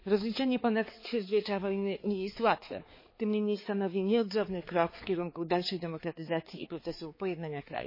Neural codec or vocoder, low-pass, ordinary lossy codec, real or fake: codec, 16 kHz, 4 kbps, X-Codec, HuBERT features, trained on balanced general audio; 5.4 kHz; MP3, 24 kbps; fake